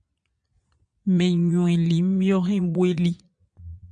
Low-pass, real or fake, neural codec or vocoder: 9.9 kHz; fake; vocoder, 22.05 kHz, 80 mel bands, Vocos